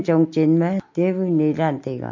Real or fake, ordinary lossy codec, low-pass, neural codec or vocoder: real; MP3, 48 kbps; 7.2 kHz; none